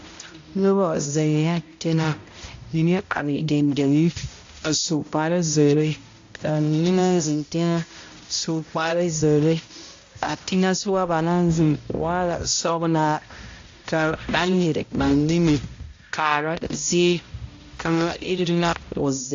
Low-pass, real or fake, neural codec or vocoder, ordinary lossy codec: 7.2 kHz; fake; codec, 16 kHz, 0.5 kbps, X-Codec, HuBERT features, trained on balanced general audio; AAC, 48 kbps